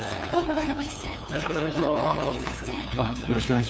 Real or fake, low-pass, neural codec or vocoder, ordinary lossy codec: fake; none; codec, 16 kHz, 2 kbps, FunCodec, trained on LibriTTS, 25 frames a second; none